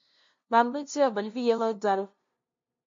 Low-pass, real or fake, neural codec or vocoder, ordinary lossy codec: 7.2 kHz; fake; codec, 16 kHz, 0.5 kbps, FunCodec, trained on LibriTTS, 25 frames a second; MP3, 48 kbps